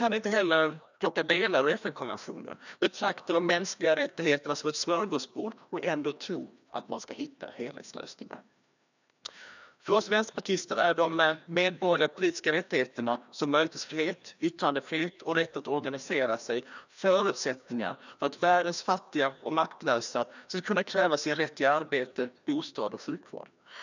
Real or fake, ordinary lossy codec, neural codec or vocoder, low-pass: fake; none; codec, 16 kHz, 1 kbps, FreqCodec, larger model; 7.2 kHz